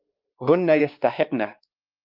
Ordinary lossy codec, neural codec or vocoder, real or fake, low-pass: Opus, 24 kbps; codec, 16 kHz, 2 kbps, X-Codec, WavLM features, trained on Multilingual LibriSpeech; fake; 5.4 kHz